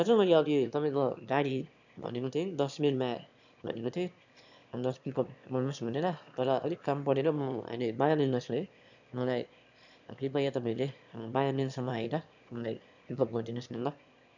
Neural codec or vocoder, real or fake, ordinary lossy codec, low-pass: autoencoder, 22.05 kHz, a latent of 192 numbers a frame, VITS, trained on one speaker; fake; none; 7.2 kHz